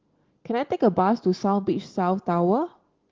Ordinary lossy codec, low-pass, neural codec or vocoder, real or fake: Opus, 16 kbps; 7.2 kHz; none; real